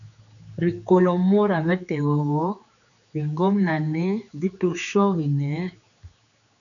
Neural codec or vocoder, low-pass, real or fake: codec, 16 kHz, 4 kbps, X-Codec, HuBERT features, trained on general audio; 7.2 kHz; fake